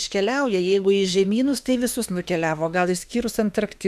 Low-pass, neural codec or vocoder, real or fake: 14.4 kHz; autoencoder, 48 kHz, 32 numbers a frame, DAC-VAE, trained on Japanese speech; fake